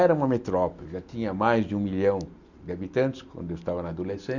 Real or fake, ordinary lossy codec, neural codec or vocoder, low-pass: real; none; none; 7.2 kHz